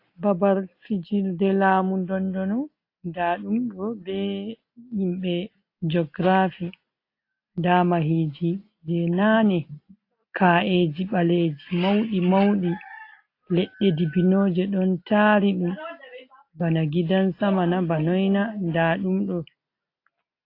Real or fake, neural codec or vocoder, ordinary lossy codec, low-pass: real; none; AAC, 32 kbps; 5.4 kHz